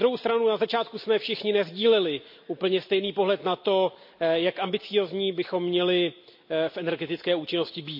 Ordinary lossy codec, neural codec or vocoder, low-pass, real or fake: MP3, 32 kbps; none; 5.4 kHz; real